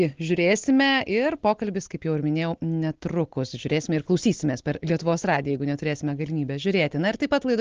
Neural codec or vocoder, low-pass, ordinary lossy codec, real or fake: none; 7.2 kHz; Opus, 16 kbps; real